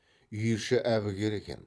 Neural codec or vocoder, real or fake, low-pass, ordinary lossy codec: vocoder, 22.05 kHz, 80 mel bands, Vocos; fake; none; none